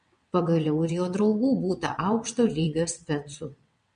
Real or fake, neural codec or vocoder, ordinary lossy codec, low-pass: fake; vocoder, 22.05 kHz, 80 mel bands, WaveNeXt; MP3, 48 kbps; 9.9 kHz